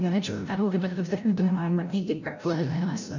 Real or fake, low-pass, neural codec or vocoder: fake; 7.2 kHz; codec, 16 kHz, 0.5 kbps, FreqCodec, larger model